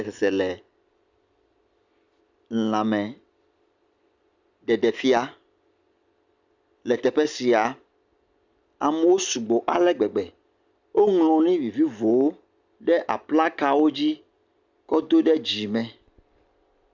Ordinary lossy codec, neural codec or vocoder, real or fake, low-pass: Opus, 64 kbps; none; real; 7.2 kHz